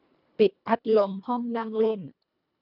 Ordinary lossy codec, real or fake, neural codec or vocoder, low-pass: none; fake; codec, 24 kHz, 1.5 kbps, HILCodec; 5.4 kHz